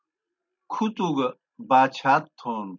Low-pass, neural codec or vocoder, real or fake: 7.2 kHz; none; real